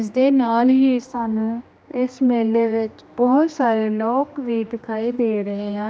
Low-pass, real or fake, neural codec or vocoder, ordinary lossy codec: none; fake; codec, 16 kHz, 2 kbps, X-Codec, HuBERT features, trained on general audio; none